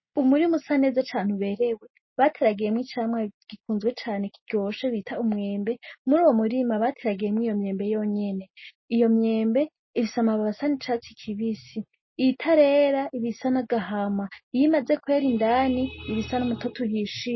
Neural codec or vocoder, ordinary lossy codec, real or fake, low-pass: none; MP3, 24 kbps; real; 7.2 kHz